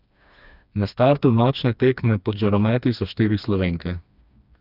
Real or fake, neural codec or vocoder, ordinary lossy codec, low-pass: fake; codec, 16 kHz, 2 kbps, FreqCodec, smaller model; none; 5.4 kHz